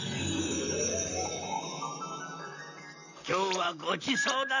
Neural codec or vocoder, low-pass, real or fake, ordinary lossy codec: vocoder, 44.1 kHz, 128 mel bands, Pupu-Vocoder; 7.2 kHz; fake; none